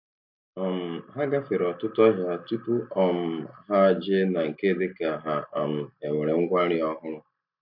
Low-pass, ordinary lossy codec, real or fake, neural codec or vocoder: 5.4 kHz; MP3, 48 kbps; real; none